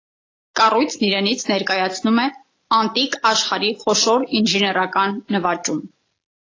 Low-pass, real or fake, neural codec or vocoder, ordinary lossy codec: 7.2 kHz; real; none; AAC, 32 kbps